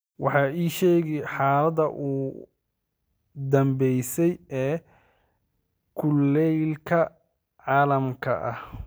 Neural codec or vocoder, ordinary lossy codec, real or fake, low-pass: none; none; real; none